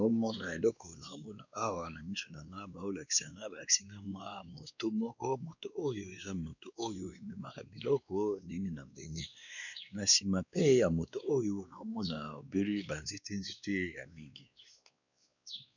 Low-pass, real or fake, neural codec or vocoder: 7.2 kHz; fake; codec, 16 kHz, 2 kbps, X-Codec, WavLM features, trained on Multilingual LibriSpeech